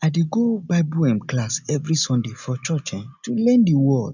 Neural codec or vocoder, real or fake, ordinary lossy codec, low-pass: none; real; none; 7.2 kHz